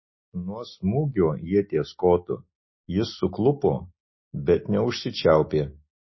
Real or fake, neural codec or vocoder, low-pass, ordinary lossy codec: real; none; 7.2 kHz; MP3, 24 kbps